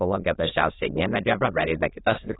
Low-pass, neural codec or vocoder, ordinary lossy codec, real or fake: 7.2 kHz; autoencoder, 22.05 kHz, a latent of 192 numbers a frame, VITS, trained on many speakers; AAC, 16 kbps; fake